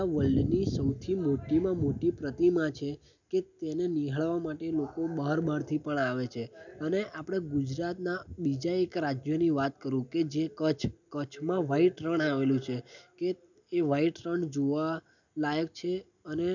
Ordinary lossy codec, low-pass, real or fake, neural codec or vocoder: none; 7.2 kHz; real; none